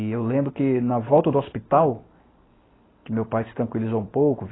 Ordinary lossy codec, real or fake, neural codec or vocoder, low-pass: AAC, 16 kbps; real; none; 7.2 kHz